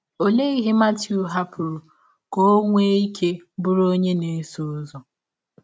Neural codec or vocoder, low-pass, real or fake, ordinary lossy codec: none; none; real; none